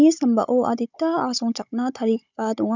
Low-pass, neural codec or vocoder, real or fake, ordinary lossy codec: 7.2 kHz; codec, 16 kHz, 16 kbps, FunCodec, trained on Chinese and English, 50 frames a second; fake; none